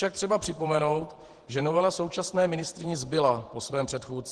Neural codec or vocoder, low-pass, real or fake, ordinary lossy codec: vocoder, 48 kHz, 128 mel bands, Vocos; 10.8 kHz; fake; Opus, 16 kbps